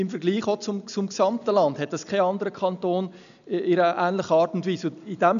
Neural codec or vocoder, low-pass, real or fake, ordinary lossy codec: none; 7.2 kHz; real; none